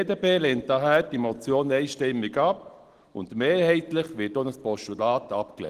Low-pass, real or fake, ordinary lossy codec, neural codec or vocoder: 14.4 kHz; real; Opus, 16 kbps; none